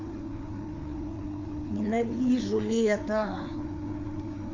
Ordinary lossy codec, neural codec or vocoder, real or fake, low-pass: MP3, 48 kbps; codec, 16 kHz, 4 kbps, FreqCodec, larger model; fake; 7.2 kHz